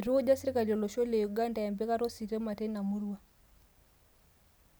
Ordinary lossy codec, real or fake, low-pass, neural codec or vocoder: none; real; none; none